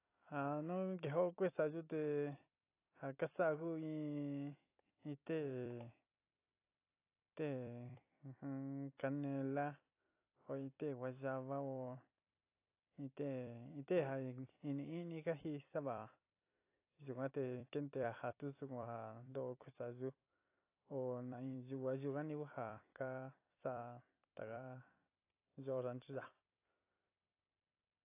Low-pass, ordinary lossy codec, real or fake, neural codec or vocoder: 3.6 kHz; AAC, 24 kbps; fake; vocoder, 44.1 kHz, 128 mel bands every 256 samples, BigVGAN v2